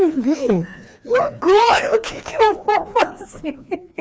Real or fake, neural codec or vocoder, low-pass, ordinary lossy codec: fake; codec, 16 kHz, 2 kbps, FreqCodec, larger model; none; none